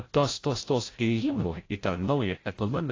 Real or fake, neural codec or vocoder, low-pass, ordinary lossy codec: fake; codec, 16 kHz, 0.5 kbps, FreqCodec, larger model; 7.2 kHz; AAC, 32 kbps